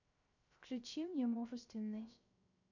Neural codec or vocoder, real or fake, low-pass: codec, 16 kHz, 0.3 kbps, FocalCodec; fake; 7.2 kHz